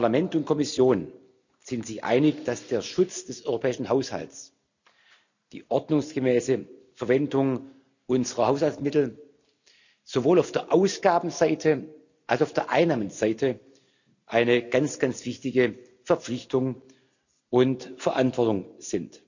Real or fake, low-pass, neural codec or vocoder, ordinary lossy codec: real; 7.2 kHz; none; none